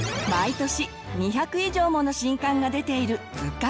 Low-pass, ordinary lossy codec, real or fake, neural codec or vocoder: none; none; real; none